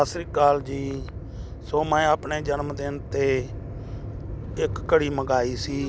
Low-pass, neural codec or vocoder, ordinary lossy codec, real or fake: none; none; none; real